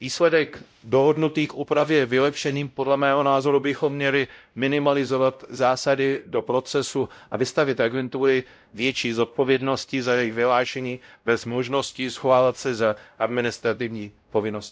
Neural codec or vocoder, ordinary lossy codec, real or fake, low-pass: codec, 16 kHz, 0.5 kbps, X-Codec, WavLM features, trained on Multilingual LibriSpeech; none; fake; none